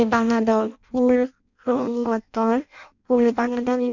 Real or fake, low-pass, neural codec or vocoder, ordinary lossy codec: fake; 7.2 kHz; codec, 16 kHz in and 24 kHz out, 0.6 kbps, FireRedTTS-2 codec; none